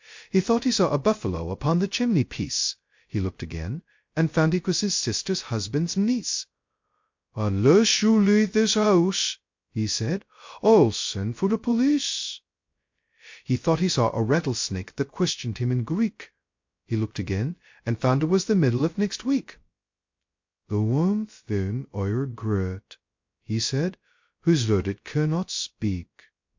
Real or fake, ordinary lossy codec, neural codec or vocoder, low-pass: fake; MP3, 48 kbps; codec, 16 kHz, 0.2 kbps, FocalCodec; 7.2 kHz